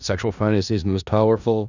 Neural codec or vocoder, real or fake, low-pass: codec, 16 kHz in and 24 kHz out, 0.4 kbps, LongCat-Audio-Codec, four codebook decoder; fake; 7.2 kHz